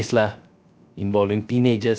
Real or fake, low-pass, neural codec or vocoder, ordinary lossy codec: fake; none; codec, 16 kHz, 0.3 kbps, FocalCodec; none